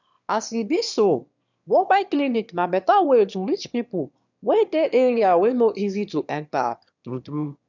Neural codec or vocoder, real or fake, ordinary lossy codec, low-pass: autoencoder, 22.05 kHz, a latent of 192 numbers a frame, VITS, trained on one speaker; fake; none; 7.2 kHz